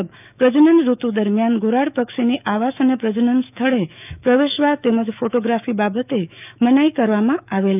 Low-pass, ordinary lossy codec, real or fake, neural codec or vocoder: 3.6 kHz; none; fake; autoencoder, 48 kHz, 128 numbers a frame, DAC-VAE, trained on Japanese speech